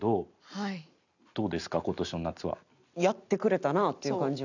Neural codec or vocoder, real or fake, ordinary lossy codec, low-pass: none; real; none; 7.2 kHz